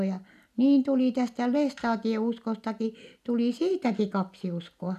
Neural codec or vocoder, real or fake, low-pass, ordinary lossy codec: none; real; 14.4 kHz; none